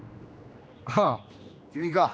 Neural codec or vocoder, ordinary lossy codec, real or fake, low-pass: codec, 16 kHz, 2 kbps, X-Codec, HuBERT features, trained on general audio; none; fake; none